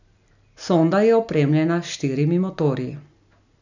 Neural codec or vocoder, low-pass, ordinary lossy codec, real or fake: none; 7.2 kHz; none; real